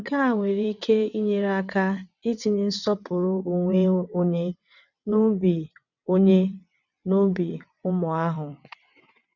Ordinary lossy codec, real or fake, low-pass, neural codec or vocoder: none; fake; 7.2 kHz; vocoder, 22.05 kHz, 80 mel bands, WaveNeXt